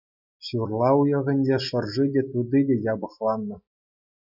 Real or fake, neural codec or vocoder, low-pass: real; none; 5.4 kHz